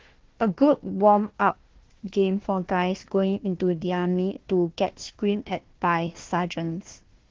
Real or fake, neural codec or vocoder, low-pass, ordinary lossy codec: fake; codec, 16 kHz, 1 kbps, FunCodec, trained on Chinese and English, 50 frames a second; 7.2 kHz; Opus, 16 kbps